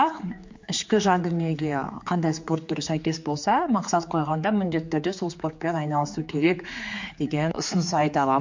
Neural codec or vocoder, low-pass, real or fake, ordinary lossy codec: codec, 16 kHz, 4 kbps, X-Codec, HuBERT features, trained on general audio; 7.2 kHz; fake; MP3, 48 kbps